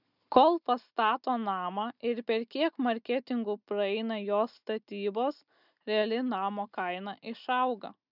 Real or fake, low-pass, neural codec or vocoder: real; 5.4 kHz; none